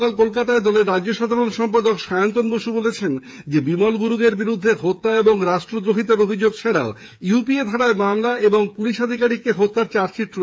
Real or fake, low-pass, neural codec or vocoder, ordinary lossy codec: fake; none; codec, 16 kHz, 8 kbps, FreqCodec, smaller model; none